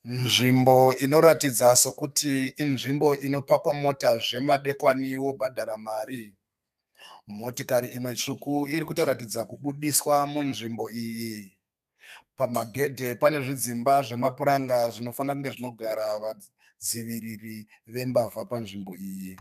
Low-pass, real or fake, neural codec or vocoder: 14.4 kHz; fake; codec, 32 kHz, 1.9 kbps, SNAC